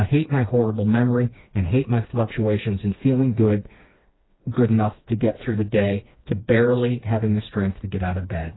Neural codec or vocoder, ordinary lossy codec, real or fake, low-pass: codec, 16 kHz, 2 kbps, FreqCodec, smaller model; AAC, 16 kbps; fake; 7.2 kHz